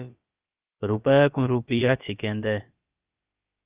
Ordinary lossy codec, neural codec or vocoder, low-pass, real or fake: Opus, 32 kbps; codec, 16 kHz, about 1 kbps, DyCAST, with the encoder's durations; 3.6 kHz; fake